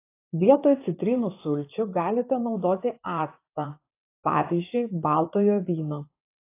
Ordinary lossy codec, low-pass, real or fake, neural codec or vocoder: AAC, 24 kbps; 3.6 kHz; fake; vocoder, 44.1 kHz, 128 mel bands, Pupu-Vocoder